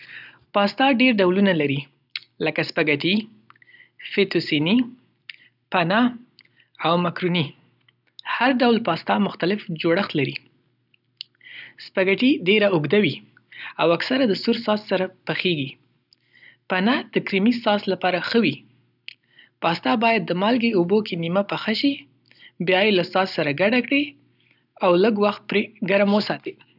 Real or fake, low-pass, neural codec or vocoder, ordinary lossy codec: real; 5.4 kHz; none; none